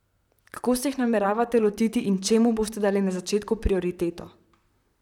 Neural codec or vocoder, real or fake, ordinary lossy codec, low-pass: vocoder, 44.1 kHz, 128 mel bands, Pupu-Vocoder; fake; none; 19.8 kHz